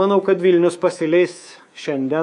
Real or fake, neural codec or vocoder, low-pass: fake; codec, 24 kHz, 3.1 kbps, DualCodec; 10.8 kHz